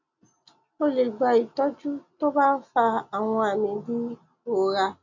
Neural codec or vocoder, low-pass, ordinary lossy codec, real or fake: none; 7.2 kHz; none; real